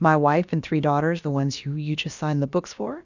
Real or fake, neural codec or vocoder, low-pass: fake; codec, 16 kHz, about 1 kbps, DyCAST, with the encoder's durations; 7.2 kHz